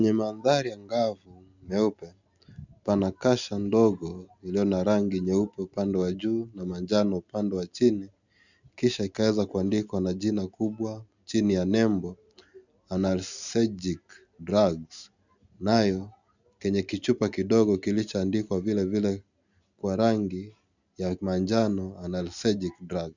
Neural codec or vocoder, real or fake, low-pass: none; real; 7.2 kHz